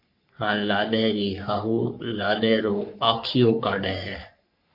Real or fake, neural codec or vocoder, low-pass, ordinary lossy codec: fake; codec, 44.1 kHz, 3.4 kbps, Pupu-Codec; 5.4 kHz; MP3, 48 kbps